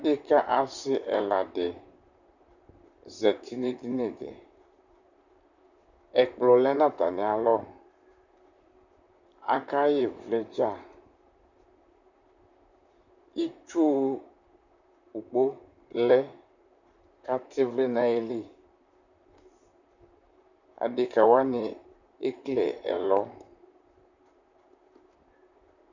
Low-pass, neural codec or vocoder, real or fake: 7.2 kHz; vocoder, 44.1 kHz, 128 mel bands, Pupu-Vocoder; fake